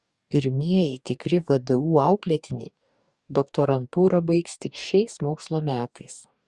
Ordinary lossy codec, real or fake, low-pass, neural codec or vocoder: Opus, 64 kbps; fake; 10.8 kHz; codec, 44.1 kHz, 2.6 kbps, DAC